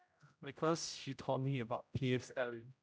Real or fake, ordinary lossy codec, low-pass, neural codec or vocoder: fake; none; none; codec, 16 kHz, 0.5 kbps, X-Codec, HuBERT features, trained on general audio